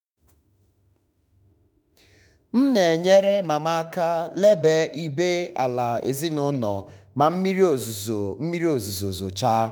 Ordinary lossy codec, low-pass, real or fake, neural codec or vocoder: none; none; fake; autoencoder, 48 kHz, 32 numbers a frame, DAC-VAE, trained on Japanese speech